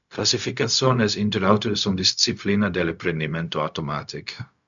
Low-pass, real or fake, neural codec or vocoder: 7.2 kHz; fake; codec, 16 kHz, 0.4 kbps, LongCat-Audio-Codec